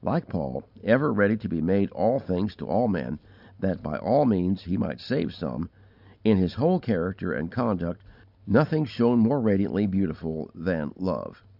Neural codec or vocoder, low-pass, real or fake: none; 5.4 kHz; real